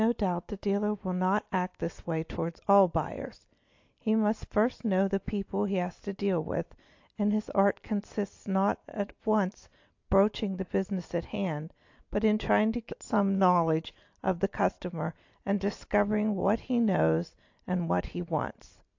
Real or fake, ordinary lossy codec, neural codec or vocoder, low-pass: real; AAC, 48 kbps; none; 7.2 kHz